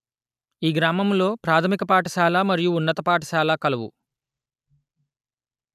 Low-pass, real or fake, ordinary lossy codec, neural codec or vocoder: 14.4 kHz; real; none; none